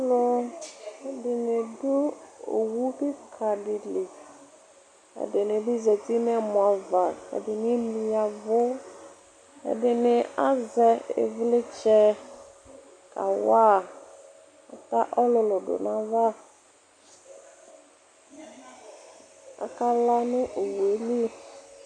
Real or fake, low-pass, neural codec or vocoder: real; 9.9 kHz; none